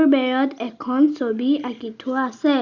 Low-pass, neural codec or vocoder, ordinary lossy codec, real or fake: 7.2 kHz; none; Opus, 64 kbps; real